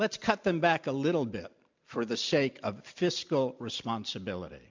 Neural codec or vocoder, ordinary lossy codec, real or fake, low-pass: none; MP3, 64 kbps; real; 7.2 kHz